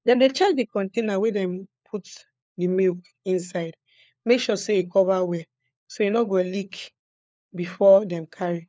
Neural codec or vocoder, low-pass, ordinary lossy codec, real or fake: codec, 16 kHz, 4 kbps, FunCodec, trained on LibriTTS, 50 frames a second; none; none; fake